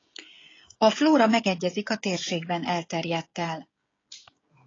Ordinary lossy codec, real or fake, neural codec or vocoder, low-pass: AAC, 32 kbps; fake; codec, 16 kHz, 16 kbps, FreqCodec, smaller model; 7.2 kHz